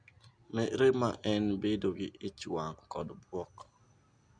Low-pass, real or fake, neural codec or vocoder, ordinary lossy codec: 9.9 kHz; real; none; none